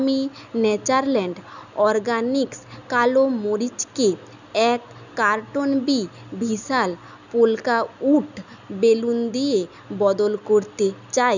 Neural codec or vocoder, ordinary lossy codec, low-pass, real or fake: none; none; 7.2 kHz; real